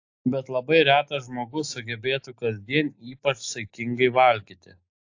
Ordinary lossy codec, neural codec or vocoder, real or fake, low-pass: AAC, 48 kbps; none; real; 7.2 kHz